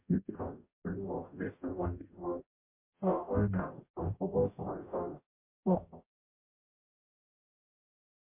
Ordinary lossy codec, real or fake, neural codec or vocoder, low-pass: MP3, 24 kbps; fake; codec, 44.1 kHz, 0.9 kbps, DAC; 3.6 kHz